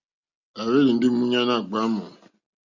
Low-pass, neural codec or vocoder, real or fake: 7.2 kHz; none; real